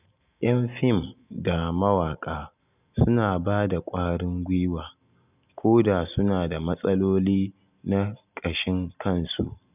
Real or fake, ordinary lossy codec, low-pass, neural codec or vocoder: real; none; 3.6 kHz; none